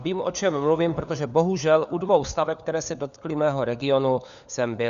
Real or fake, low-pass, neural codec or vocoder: fake; 7.2 kHz; codec, 16 kHz, 4 kbps, FunCodec, trained on LibriTTS, 50 frames a second